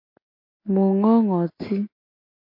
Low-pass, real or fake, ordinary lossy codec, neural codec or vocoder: 5.4 kHz; real; MP3, 32 kbps; none